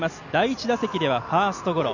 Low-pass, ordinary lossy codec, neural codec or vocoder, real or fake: 7.2 kHz; none; none; real